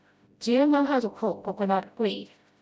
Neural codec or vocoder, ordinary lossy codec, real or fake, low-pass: codec, 16 kHz, 0.5 kbps, FreqCodec, smaller model; none; fake; none